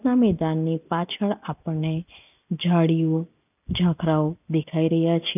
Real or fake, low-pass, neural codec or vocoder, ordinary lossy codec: real; 3.6 kHz; none; none